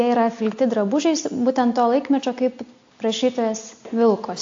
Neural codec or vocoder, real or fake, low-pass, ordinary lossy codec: none; real; 7.2 kHz; AAC, 48 kbps